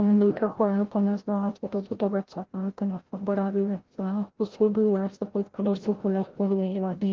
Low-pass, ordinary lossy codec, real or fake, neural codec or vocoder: 7.2 kHz; Opus, 16 kbps; fake; codec, 16 kHz, 0.5 kbps, FreqCodec, larger model